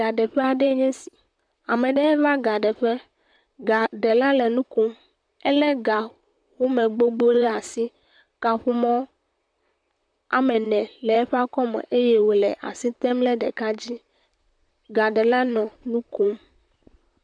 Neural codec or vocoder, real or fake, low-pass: vocoder, 44.1 kHz, 128 mel bands, Pupu-Vocoder; fake; 9.9 kHz